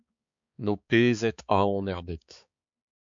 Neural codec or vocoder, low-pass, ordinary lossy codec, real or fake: codec, 16 kHz, 4 kbps, X-Codec, HuBERT features, trained on balanced general audio; 7.2 kHz; MP3, 64 kbps; fake